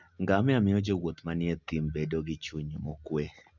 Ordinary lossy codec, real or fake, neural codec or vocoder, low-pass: none; real; none; 7.2 kHz